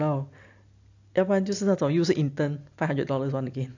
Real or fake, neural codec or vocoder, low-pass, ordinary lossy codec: real; none; 7.2 kHz; AAC, 48 kbps